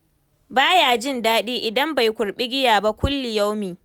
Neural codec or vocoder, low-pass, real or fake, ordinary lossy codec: none; none; real; none